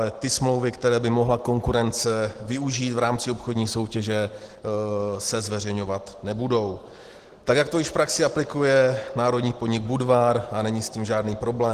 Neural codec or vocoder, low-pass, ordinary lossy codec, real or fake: none; 14.4 kHz; Opus, 16 kbps; real